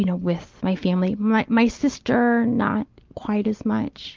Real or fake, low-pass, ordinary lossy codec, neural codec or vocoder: real; 7.2 kHz; Opus, 32 kbps; none